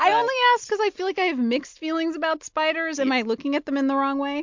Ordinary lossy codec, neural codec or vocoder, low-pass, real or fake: MP3, 64 kbps; none; 7.2 kHz; real